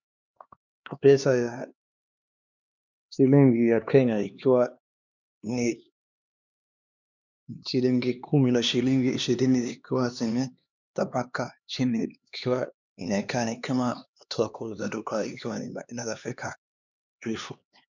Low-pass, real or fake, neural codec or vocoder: 7.2 kHz; fake; codec, 16 kHz, 2 kbps, X-Codec, HuBERT features, trained on LibriSpeech